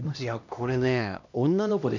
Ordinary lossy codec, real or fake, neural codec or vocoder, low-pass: none; fake; codec, 16 kHz, 1 kbps, X-Codec, HuBERT features, trained on LibriSpeech; 7.2 kHz